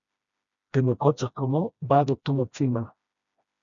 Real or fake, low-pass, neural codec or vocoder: fake; 7.2 kHz; codec, 16 kHz, 1 kbps, FreqCodec, smaller model